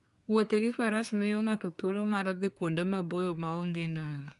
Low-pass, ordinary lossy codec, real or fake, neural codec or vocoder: 10.8 kHz; none; fake; codec, 24 kHz, 1 kbps, SNAC